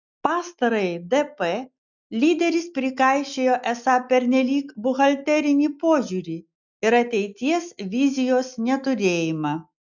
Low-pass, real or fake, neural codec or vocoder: 7.2 kHz; real; none